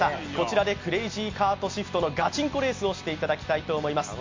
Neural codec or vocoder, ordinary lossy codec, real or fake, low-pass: none; MP3, 48 kbps; real; 7.2 kHz